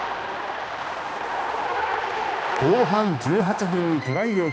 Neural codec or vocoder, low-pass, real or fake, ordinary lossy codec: codec, 16 kHz, 2 kbps, X-Codec, HuBERT features, trained on balanced general audio; none; fake; none